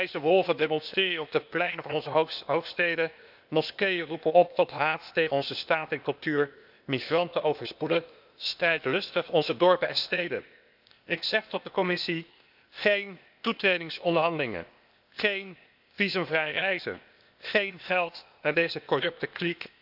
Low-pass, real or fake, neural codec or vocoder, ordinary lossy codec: 5.4 kHz; fake; codec, 16 kHz, 0.8 kbps, ZipCodec; none